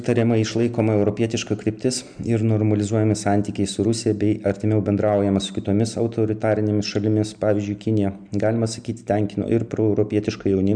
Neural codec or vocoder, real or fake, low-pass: none; real; 9.9 kHz